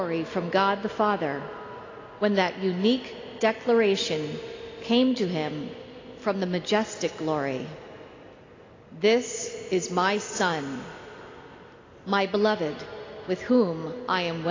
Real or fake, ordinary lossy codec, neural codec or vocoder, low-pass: real; AAC, 32 kbps; none; 7.2 kHz